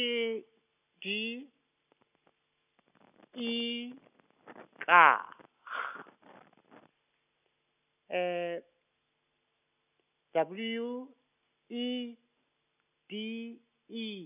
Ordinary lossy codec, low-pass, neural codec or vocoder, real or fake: none; 3.6 kHz; none; real